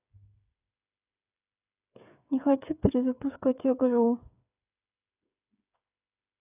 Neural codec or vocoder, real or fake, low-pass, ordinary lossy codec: codec, 16 kHz, 8 kbps, FreqCodec, smaller model; fake; 3.6 kHz; none